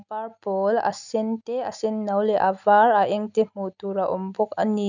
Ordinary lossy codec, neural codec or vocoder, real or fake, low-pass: none; none; real; 7.2 kHz